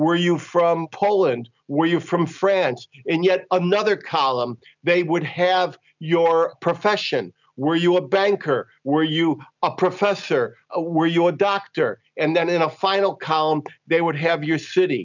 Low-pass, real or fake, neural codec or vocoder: 7.2 kHz; real; none